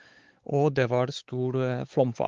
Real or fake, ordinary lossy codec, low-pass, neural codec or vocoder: fake; Opus, 32 kbps; 7.2 kHz; codec, 16 kHz, 4 kbps, X-Codec, WavLM features, trained on Multilingual LibriSpeech